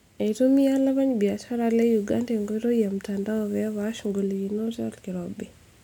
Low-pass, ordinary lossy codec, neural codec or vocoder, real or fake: 19.8 kHz; none; none; real